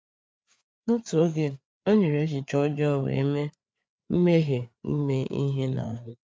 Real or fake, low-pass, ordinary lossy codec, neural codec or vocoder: fake; none; none; codec, 16 kHz, 4 kbps, FreqCodec, larger model